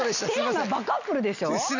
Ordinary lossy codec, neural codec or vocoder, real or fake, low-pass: none; none; real; 7.2 kHz